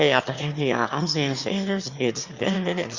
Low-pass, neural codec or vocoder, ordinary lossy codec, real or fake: 7.2 kHz; autoencoder, 22.05 kHz, a latent of 192 numbers a frame, VITS, trained on one speaker; Opus, 64 kbps; fake